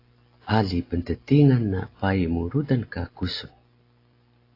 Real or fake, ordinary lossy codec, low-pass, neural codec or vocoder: real; AAC, 32 kbps; 5.4 kHz; none